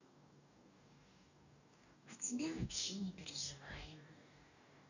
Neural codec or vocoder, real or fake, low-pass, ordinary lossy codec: codec, 44.1 kHz, 2.6 kbps, DAC; fake; 7.2 kHz; none